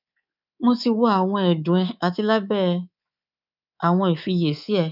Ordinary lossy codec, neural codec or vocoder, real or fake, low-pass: none; codec, 24 kHz, 3.1 kbps, DualCodec; fake; 5.4 kHz